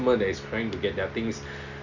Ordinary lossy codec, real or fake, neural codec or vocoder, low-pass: none; real; none; 7.2 kHz